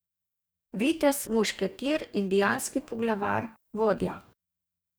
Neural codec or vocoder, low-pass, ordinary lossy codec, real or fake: codec, 44.1 kHz, 2.6 kbps, DAC; none; none; fake